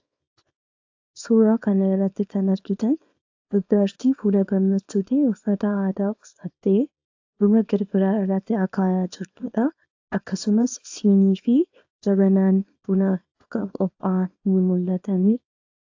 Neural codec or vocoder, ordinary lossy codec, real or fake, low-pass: codec, 24 kHz, 0.9 kbps, WavTokenizer, small release; AAC, 48 kbps; fake; 7.2 kHz